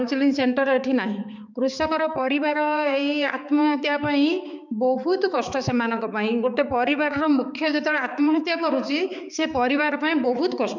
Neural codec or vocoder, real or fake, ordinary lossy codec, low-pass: codec, 16 kHz, 4 kbps, X-Codec, HuBERT features, trained on balanced general audio; fake; none; 7.2 kHz